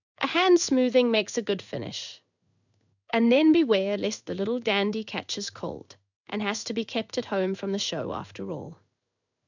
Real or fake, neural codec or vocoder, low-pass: fake; codec, 16 kHz in and 24 kHz out, 1 kbps, XY-Tokenizer; 7.2 kHz